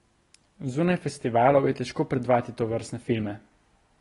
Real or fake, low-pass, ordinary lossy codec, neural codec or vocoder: real; 10.8 kHz; AAC, 32 kbps; none